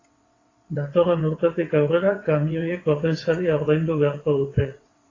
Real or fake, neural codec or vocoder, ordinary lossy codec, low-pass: fake; vocoder, 22.05 kHz, 80 mel bands, WaveNeXt; AAC, 32 kbps; 7.2 kHz